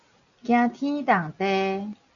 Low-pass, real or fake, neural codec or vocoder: 7.2 kHz; real; none